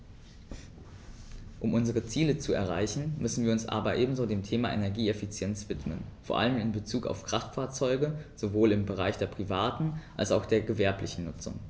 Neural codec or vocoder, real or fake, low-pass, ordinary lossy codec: none; real; none; none